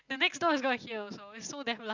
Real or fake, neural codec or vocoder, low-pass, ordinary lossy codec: real; none; 7.2 kHz; Opus, 64 kbps